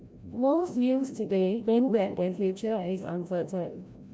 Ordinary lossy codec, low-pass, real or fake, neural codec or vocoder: none; none; fake; codec, 16 kHz, 0.5 kbps, FreqCodec, larger model